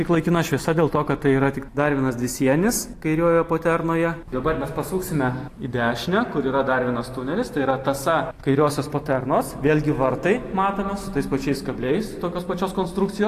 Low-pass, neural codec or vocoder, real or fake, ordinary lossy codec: 14.4 kHz; none; real; AAC, 64 kbps